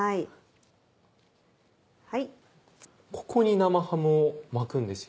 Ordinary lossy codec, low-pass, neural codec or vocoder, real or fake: none; none; none; real